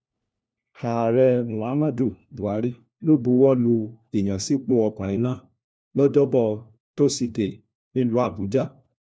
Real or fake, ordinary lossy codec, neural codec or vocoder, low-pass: fake; none; codec, 16 kHz, 1 kbps, FunCodec, trained on LibriTTS, 50 frames a second; none